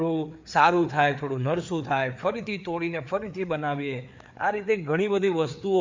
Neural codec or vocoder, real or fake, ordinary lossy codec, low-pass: codec, 16 kHz, 4 kbps, FreqCodec, larger model; fake; MP3, 64 kbps; 7.2 kHz